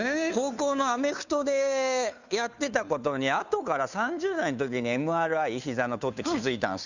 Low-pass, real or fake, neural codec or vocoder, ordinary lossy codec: 7.2 kHz; fake; codec, 16 kHz, 2 kbps, FunCodec, trained on Chinese and English, 25 frames a second; none